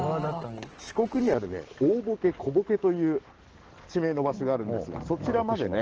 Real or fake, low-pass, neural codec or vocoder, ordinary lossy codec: fake; 7.2 kHz; codec, 44.1 kHz, 7.8 kbps, DAC; Opus, 16 kbps